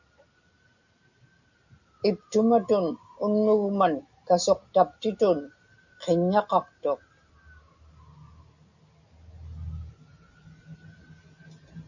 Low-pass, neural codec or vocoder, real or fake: 7.2 kHz; none; real